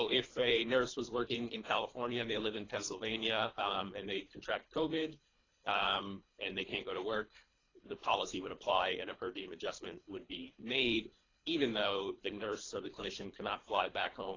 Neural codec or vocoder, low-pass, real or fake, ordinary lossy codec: codec, 24 kHz, 3 kbps, HILCodec; 7.2 kHz; fake; AAC, 32 kbps